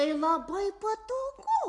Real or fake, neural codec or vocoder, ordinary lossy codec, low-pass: real; none; AAC, 48 kbps; 10.8 kHz